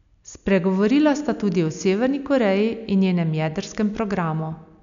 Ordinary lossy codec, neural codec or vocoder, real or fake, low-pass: none; none; real; 7.2 kHz